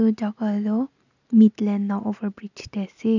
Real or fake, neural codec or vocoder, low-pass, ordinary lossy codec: real; none; 7.2 kHz; none